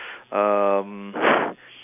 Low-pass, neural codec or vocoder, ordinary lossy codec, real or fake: 3.6 kHz; none; none; real